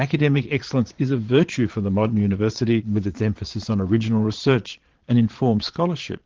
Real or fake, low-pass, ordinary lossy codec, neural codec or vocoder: fake; 7.2 kHz; Opus, 16 kbps; vocoder, 22.05 kHz, 80 mel bands, Vocos